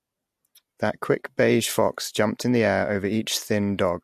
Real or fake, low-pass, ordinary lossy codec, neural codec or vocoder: real; 14.4 kHz; MP3, 64 kbps; none